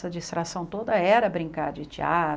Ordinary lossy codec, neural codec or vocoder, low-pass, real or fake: none; none; none; real